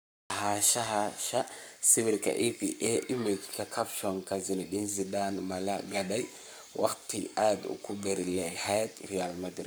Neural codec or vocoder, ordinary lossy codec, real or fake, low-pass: codec, 44.1 kHz, 7.8 kbps, Pupu-Codec; none; fake; none